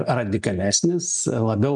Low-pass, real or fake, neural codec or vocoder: 10.8 kHz; real; none